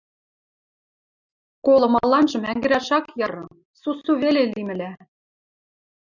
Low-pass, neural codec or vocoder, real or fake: 7.2 kHz; none; real